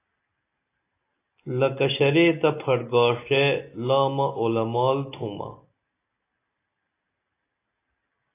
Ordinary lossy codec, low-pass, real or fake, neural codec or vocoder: AAC, 24 kbps; 3.6 kHz; real; none